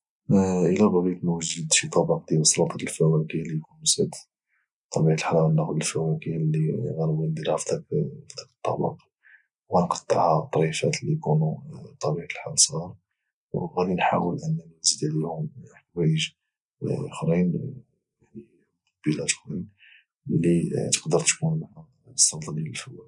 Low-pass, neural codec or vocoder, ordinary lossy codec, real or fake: 10.8 kHz; none; none; real